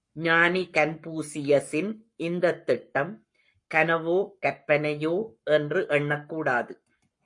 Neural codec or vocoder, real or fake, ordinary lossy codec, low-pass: codec, 44.1 kHz, 7.8 kbps, Pupu-Codec; fake; MP3, 48 kbps; 10.8 kHz